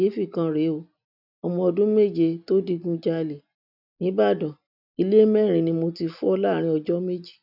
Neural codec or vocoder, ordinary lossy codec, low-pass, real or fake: none; none; 5.4 kHz; real